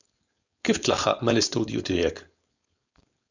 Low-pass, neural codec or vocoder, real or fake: 7.2 kHz; codec, 16 kHz, 4.8 kbps, FACodec; fake